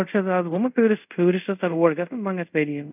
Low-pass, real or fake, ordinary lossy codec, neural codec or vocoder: 3.6 kHz; fake; none; codec, 24 kHz, 0.5 kbps, DualCodec